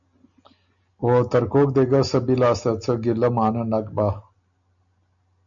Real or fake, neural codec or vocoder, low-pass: real; none; 7.2 kHz